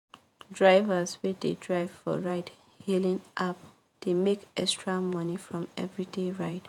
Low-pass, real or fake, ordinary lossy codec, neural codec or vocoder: 19.8 kHz; fake; none; vocoder, 48 kHz, 128 mel bands, Vocos